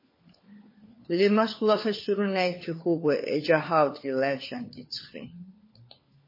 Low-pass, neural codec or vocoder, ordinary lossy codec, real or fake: 5.4 kHz; codec, 16 kHz, 4 kbps, FunCodec, trained on LibriTTS, 50 frames a second; MP3, 24 kbps; fake